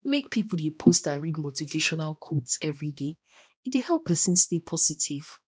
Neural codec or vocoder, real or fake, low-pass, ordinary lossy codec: codec, 16 kHz, 1 kbps, X-Codec, HuBERT features, trained on balanced general audio; fake; none; none